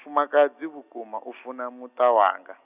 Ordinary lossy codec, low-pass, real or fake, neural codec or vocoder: none; 3.6 kHz; real; none